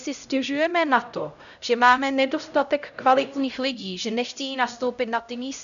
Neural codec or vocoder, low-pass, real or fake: codec, 16 kHz, 0.5 kbps, X-Codec, HuBERT features, trained on LibriSpeech; 7.2 kHz; fake